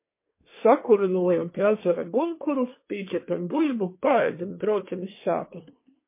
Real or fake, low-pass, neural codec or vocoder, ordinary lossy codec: fake; 3.6 kHz; codec, 24 kHz, 1 kbps, SNAC; MP3, 24 kbps